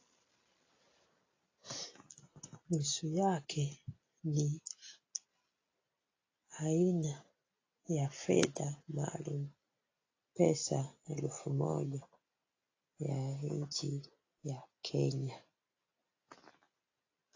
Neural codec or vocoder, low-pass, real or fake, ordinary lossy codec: none; 7.2 kHz; real; AAC, 32 kbps